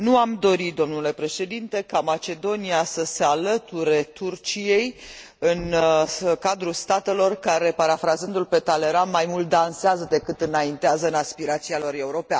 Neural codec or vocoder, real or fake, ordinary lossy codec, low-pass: none; real; none; none